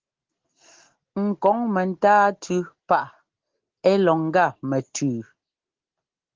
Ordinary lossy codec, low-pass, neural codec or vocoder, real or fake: Opus, 16 kbps; 7.2 kHz; none; real